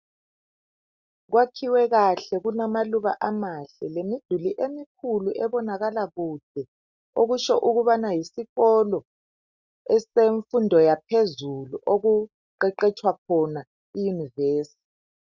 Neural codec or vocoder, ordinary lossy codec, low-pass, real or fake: none; Opus, 64 kbps; 7.2 kHz; real